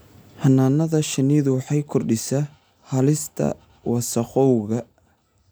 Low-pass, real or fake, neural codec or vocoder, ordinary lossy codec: none; real; none; none